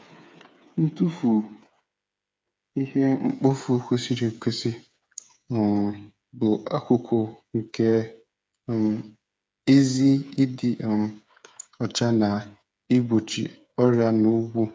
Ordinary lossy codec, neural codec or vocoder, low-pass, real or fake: none; codec, 16 kHz, 8 kbps, FreqCodec, smaller model; none; fake